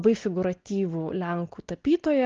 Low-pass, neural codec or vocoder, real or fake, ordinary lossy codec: 7.2 kHz; none; real; Opus, 16 kbps